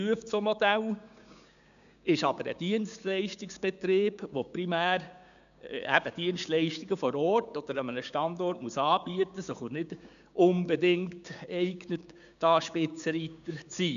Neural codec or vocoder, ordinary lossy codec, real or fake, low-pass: codec, 16 kHz, 6 kbps, DAC; none; fake; 7.2 kHz